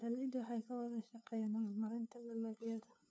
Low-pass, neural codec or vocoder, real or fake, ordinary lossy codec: none; codec, 16 kHz, 2 kbps, FunCodec, trained on LibriTTS, 25 frames a second; fake; none